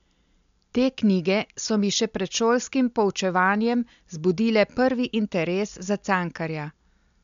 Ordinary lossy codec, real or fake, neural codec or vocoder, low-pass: MP3, 64 kbps; real; none; 7.2 kHz